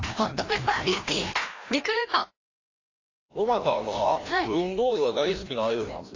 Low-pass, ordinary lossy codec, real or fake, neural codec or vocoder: 7.2 kHz; AAC, 32 kbps; fake; codec, 16 kHz, 1 kbps, FreqCodec, larger model